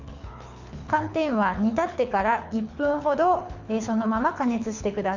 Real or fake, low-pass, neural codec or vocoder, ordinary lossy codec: fake; 7.2 kHz; codec, 24 kHz, 6 kbps, HILCodec; none